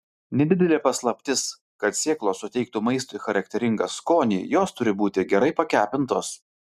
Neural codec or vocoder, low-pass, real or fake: none; 14.4 kHz; real